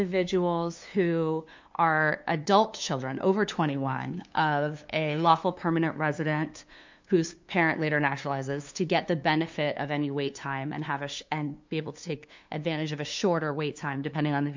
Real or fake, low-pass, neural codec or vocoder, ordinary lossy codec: fake; 7.2 kHz; codec, 16 kHz, 2 kbps, FunCodec, trained on LibriTTS, 25 frames a second; MP3, 64 kbps